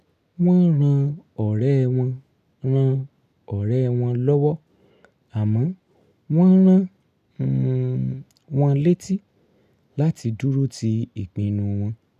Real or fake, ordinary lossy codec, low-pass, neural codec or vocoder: real; none; 14.4 kHz; none